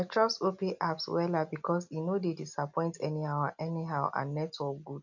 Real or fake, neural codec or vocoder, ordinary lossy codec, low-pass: real; none; none; 7.2 kHz